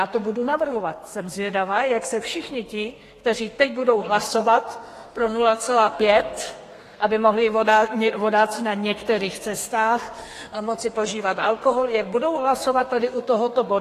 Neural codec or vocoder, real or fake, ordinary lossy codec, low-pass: codec, 32 kHz, 1.9 kbps, SNAC; fake; AAC, 48 kbps; 14.4 kHz